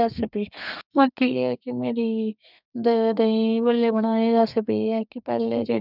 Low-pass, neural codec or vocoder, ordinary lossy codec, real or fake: 5.4 kHz; codec, 32 kHz, 1.9 kbps, SNAC; none; fake